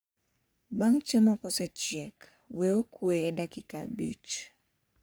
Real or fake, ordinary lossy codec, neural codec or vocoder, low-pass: fake; none; codec, 44.1 kHz, 3.4 kbps, Pupu-Codec; none